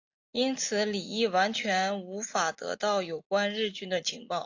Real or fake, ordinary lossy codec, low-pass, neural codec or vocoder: real; AAC, 48 kbps; 7.2 kHz; none